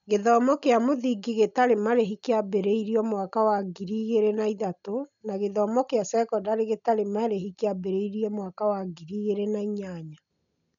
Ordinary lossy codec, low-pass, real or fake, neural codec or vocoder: none; 7.2 kHz; real; none